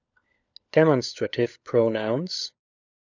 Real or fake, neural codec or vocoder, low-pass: fake; codec, 16 kHz, 16 kbps, FunCodec, trained on LibriTTS, 50 frames a second; 7.2 kHz